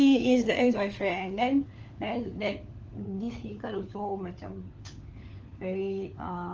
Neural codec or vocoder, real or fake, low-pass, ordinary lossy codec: codec, 16 kHz, 4 kbps, FunCodec, trained on LibriTTS, 50 frames a second; fake; 7.2 kHz; Opus, 24 kbps